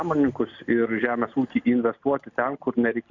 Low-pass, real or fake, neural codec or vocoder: 7.2 kHz; real; none